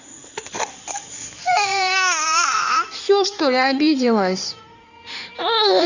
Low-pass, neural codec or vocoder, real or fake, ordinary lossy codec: 7.2 kHz; codec, 16 kHz in and 24 kHz out, 2.2 kbps, FireRedTTS-2 codec; fake; none